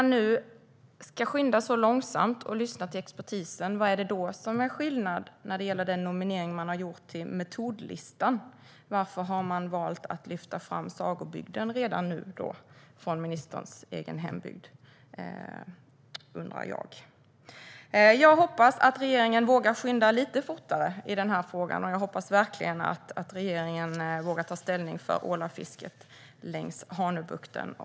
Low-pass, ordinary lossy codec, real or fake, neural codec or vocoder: none; none; real; none